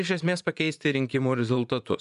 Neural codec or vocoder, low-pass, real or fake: vocoder, 24 kHz, 100 mel bands, Vocos; 10.8 kHz; fake